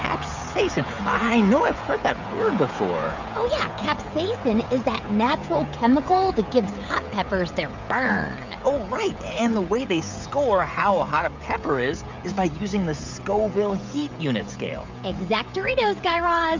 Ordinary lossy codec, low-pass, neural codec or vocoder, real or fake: MP3, 64 kbps; 7.2 kHz; codec, 16 kHz, 16 kbps, FreqCodec, smaller model; fake